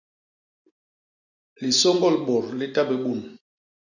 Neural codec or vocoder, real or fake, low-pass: none; real; 7.2 kHz